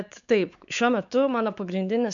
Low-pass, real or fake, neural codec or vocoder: 7.2 kHz; fake; codec, 16 kHz, 4 kbps, X-Codec, WavLM features, trained on Multilingual LibriSpeech